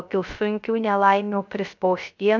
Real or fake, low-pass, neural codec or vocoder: fake; 7.2 kHz; codec, 16 kHz, 0.3 kbps, FocalCodec